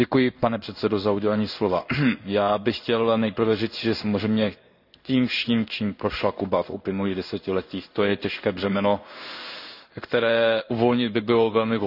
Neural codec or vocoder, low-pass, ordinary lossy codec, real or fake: codec, 16 kHz in and 24 kHz out, 1 kbps, XY-Tokenizer; 5.4 kHz; none; fake